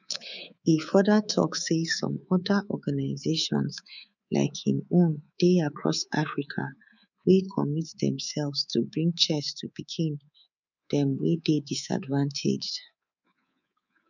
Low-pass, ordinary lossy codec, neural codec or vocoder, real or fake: 7.2 kHz; none; codec, 24 kHz, 3.1 kbps, DualCodec; fake